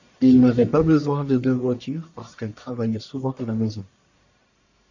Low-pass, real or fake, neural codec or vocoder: 7.2 kHz; fake; codec, 44.1 kHz, 1.7 kbps, Pupu-Codec